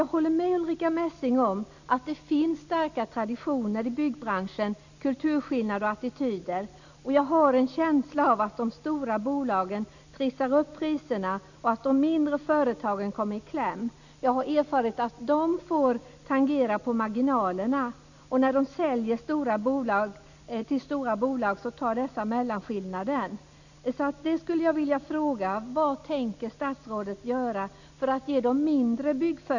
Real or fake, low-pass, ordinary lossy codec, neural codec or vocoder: real; 7.2 kHz; none; none